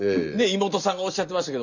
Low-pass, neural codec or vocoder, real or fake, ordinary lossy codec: 7.2 kHz; none; real; none